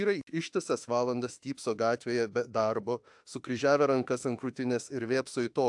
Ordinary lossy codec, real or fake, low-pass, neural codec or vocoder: AAC, 64 kbps; fake; 10.8 kHz; autoencoder, 48 kHz, 32 numbers a frame, DAC-VAE, trained on Japanese speech